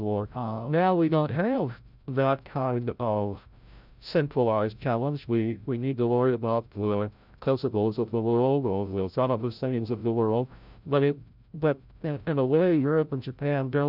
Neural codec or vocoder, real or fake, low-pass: codec, 16 kHz, 0.5 kbps, FreqCodec, larger model; fake; 5.4 kHz